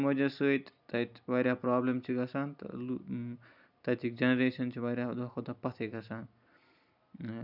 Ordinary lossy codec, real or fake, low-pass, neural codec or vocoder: none; real; 5.4 kHz; none